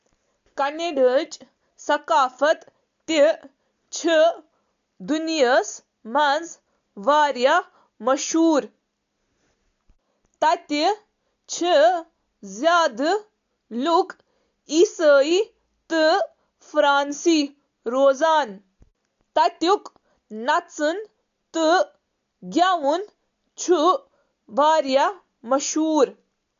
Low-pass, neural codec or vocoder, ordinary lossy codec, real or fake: 7.2 kHz; none; AAC, 64 kbps; real